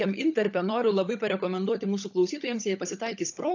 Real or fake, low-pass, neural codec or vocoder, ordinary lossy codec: fake; 7.2 kHz; codec, 16 kHz, 16 kbps, FunCodec, trained on LibriTTS, 50 frames a second; AAC, 48 kbps